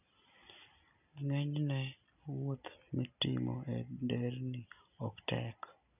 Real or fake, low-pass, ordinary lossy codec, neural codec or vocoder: real; 3.6 kHz; none; none